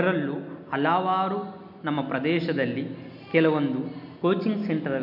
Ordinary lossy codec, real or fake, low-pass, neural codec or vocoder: none; real; 5.4 kHz; none